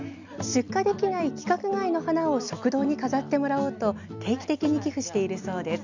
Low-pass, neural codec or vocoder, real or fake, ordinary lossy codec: 7.2 kHz; none; real; none